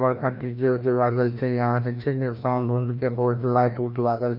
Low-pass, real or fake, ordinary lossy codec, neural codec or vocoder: 5.4 kHz; fake; none; codec, 16 kHz, 1 kbps, FreqCodec, larger model